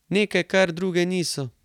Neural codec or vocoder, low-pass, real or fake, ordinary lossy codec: none; 19.8 kHz; real; none